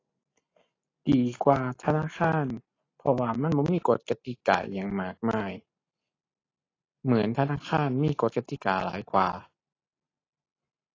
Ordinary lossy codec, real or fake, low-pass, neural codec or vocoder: AAC, 32 kbps; real; 7.2 kHz; none